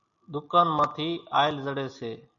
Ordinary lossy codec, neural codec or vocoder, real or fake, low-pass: MP3, 96 kbps; none; real; 7.2 kHz